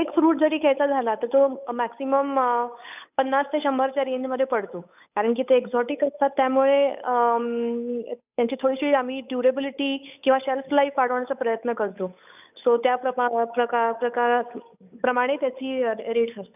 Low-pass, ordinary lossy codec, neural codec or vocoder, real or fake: 3.6 kHz; none; codec, 16 kHz, 8 kbps, FunCodec, trained on Chinese and English, 25 frames a second; fake